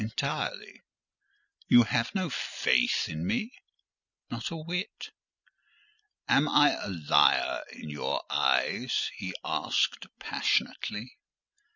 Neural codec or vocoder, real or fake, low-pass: none; real; 7.2 kHz